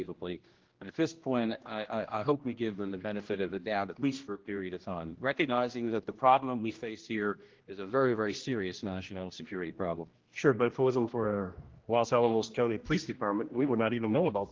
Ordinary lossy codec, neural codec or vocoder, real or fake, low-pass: Opus, 16 kbps; codec, 16 kHz, 1 kbps, X-Codec, HuBERT features, trained on general audio; fake; 7.2 kHz